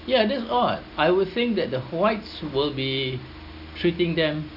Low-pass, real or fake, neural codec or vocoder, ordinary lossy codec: 5.4 kHz; real; none; none